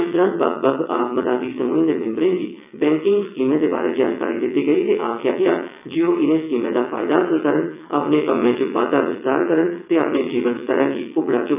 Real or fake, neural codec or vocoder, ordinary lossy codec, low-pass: fake; vocoder, 22.05 kHz, 80 mel bands, WaveNeXt; none; 3.6 kHz